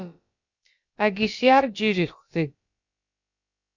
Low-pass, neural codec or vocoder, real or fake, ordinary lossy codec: 7.2 kHz; codec, 16 kHz, about 1 kbps, DyCAST, with the encoder's durations; fake; Opus, 64 kbps